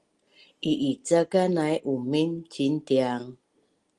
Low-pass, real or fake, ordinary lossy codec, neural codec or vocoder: 10.8 kHz; real; Opus, 24 kbps; none